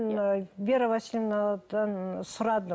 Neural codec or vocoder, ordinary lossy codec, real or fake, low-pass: none; none; real; none